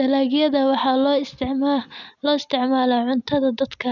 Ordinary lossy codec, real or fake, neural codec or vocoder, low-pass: none; real; none; 7.2 kHz